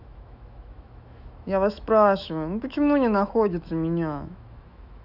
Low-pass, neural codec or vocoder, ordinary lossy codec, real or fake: 5.4 kHz; autoencoder, 48 kHz, 128 numbers a frame, DAC-VAE, trained on Japanese speech; none; fake